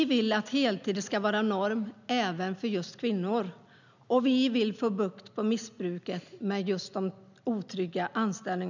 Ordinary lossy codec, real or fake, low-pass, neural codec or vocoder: none; real; 7.2 kHz; none